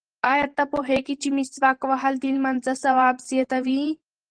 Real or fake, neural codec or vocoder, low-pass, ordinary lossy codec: fake; vocoder, 44.1 kHz, 128 mel bands every 512 samples, BigVGAN v2; 9.9 kHz; Opus, 16 kbps